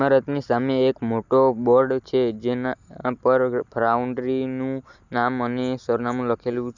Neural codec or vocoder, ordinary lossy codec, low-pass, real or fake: none; none; 7.2 kHz; real